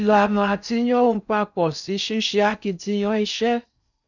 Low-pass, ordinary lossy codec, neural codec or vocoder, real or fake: 7.2 kHz; none; codec, 16 kHz in and 24 kHz out, 0.6 kbps, FocalCodec, streaming, 4096 codes; fake